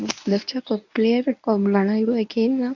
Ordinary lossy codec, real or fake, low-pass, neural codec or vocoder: none; fake; 7.2 kHz; codec, 24 kHz, 0.9 kbps, WavTokenizer, medium speech release version 1